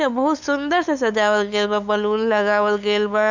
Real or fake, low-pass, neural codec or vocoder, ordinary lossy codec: fake; 7.2 kHz; codec, 16 kHz, 8 kbps, FunCodec, trained on LibriTTS, 25 frames a second; none